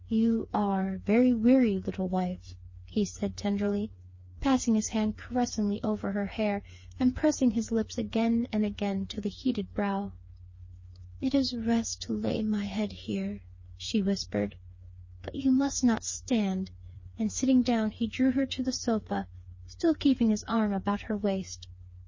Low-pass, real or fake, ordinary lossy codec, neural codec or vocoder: 7.2 kHz; fake; MP3, 32 kbps; codec, 16 kHz, 4 kbps, FreqCodec, smaller model